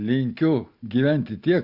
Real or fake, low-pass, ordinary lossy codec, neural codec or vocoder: real; 5.4 kHz; Opus, 64 kbps; none